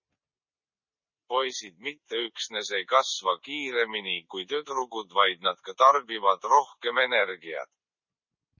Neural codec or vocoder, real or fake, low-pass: none; real; 7.2 kHz